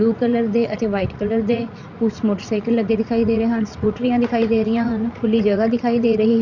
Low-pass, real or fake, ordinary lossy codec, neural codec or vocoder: 7.2 kHz; fake; none; vocoder, 44.1 kHz, 80 mel bands, Vocos